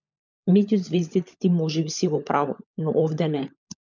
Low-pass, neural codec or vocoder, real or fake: 7.2 kHz; codec, 16 kHz, 16 kbps, FunCodec, trained on LibriTTS, 50 frames a second; fake